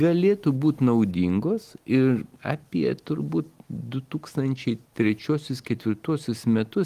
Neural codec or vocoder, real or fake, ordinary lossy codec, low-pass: none; real; Opus, 24 kbps; 14.4 kHz